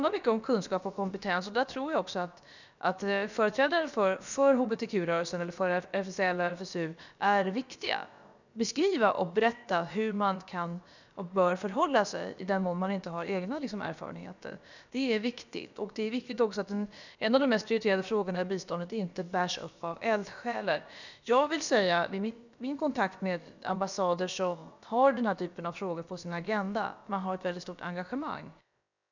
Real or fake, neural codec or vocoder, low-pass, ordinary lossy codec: fake; codec, 16 kHz, about 1 kbps, DyCAST, with the encoder's durations; 7.2 kHz; none